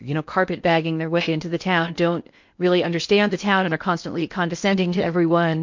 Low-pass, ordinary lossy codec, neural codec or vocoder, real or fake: 7.2 kHz; MP3, 48 kbps; codec, 16 kHz in and 24 kHz out, 0.8 kbps, FocalCodec, streaming, 65536 codes; fake